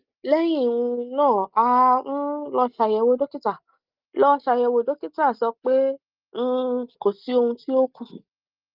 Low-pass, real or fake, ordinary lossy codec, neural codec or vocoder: 5.4 kHz; real; Opus, 32 kbps; none